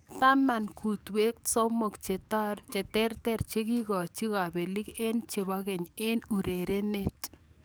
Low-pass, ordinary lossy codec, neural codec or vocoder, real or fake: none; none; codec, 44.1 kHz, 7.8 kbps, DAC; fake